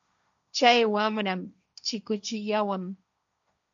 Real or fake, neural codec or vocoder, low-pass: fake; codec, 16 kHz, 1.1 kbps, Voila-Tokenizer; 7.2 kHz